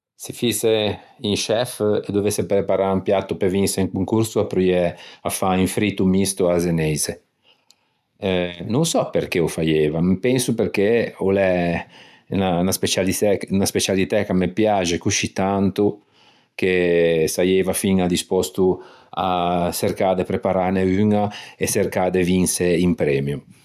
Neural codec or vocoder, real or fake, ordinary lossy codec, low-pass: none; real; none; 14.4 kHz